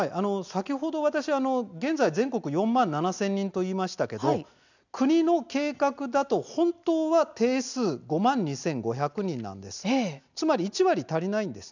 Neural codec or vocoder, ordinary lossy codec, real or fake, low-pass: none; none; real; 7.2 kHz